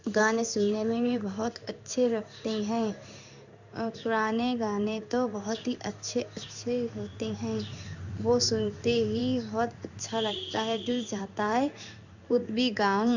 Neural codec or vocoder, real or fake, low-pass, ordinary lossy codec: codec, 16 kHz in and 24 kHz out, 1 kbps, XY-Tokenizer; fake; 7.2 kHz; none